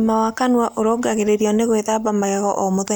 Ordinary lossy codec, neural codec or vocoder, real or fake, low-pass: none; none; real; none